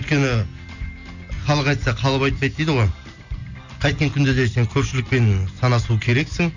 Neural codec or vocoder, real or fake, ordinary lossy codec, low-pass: none; real; none; 7.2 kHz